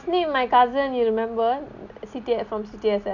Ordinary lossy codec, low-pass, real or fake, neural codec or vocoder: none; 7.2 kHz; real; none